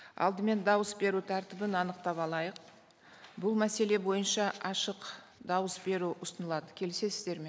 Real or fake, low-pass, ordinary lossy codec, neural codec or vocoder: real; none; none; none